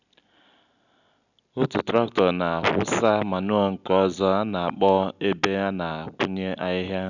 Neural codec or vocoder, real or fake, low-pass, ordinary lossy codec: none; real; 7.2 kHz; none